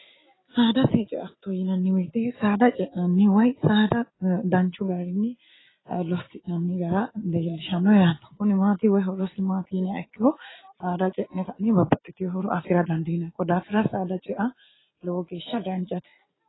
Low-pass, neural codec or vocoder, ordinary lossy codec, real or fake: 7.2 kHz; none; AAC, 16 kbps; real